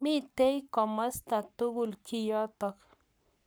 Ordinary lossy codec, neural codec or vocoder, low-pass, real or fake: none; codec, 44.1 kHz, 7.8 kbps, Pupu-Codec; none; fake